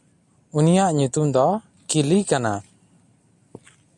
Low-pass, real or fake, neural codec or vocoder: 10.8 kHz; real; none